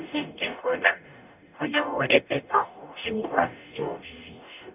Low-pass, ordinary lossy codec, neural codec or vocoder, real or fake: 3.6 kHz; none; codec, 44.1 kHz, 0.9 kbps, DAC; fake